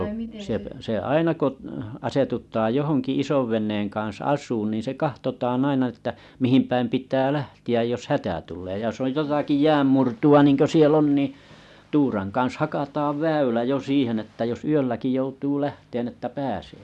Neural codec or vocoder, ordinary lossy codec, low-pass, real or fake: none; none; none; real